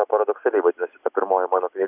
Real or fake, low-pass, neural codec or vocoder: real; 3.6 kHz; none